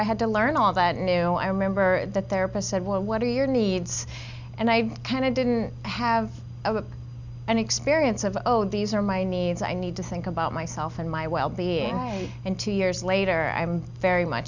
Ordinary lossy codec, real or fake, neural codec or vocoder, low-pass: Opus, 64 kbps; real; none; 7.2 kHz